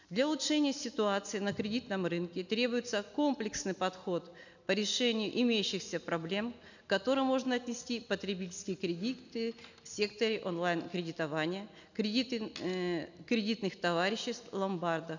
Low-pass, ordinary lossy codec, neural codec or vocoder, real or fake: 7.2 kHz; none; none; real